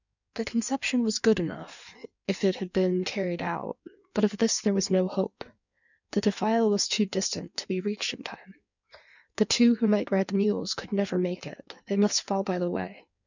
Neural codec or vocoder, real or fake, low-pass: codec, 16 kHz in and 24 kHz out, 1.1 kbps, FireRedTTS-2 codec; fake; 7.2 kHz